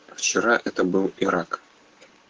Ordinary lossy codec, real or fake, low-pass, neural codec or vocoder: Opus, 16 kbps; fake; 7.2 kHz; codec, 16 kHz, 6 kbps, DAC